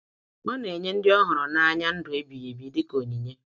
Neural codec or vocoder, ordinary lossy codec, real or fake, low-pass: none; none; real; none